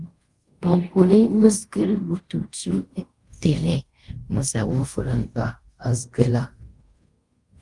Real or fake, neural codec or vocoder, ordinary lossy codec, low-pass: fake; codec, 24 kHz, 0.5 kbps, DualCodec; Opus, 32 kbps; 10.8 kHz